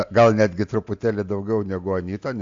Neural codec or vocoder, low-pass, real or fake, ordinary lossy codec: none; 7.2 kHz; real; AAC, 64 kbps